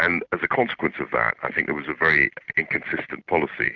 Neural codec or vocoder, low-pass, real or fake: none; 7.2 kHz; real